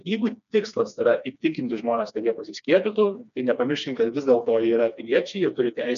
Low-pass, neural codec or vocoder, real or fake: 7.2 kHz; codec, 16 kHz, 2 kbps, FreqCodec, smaller model; fake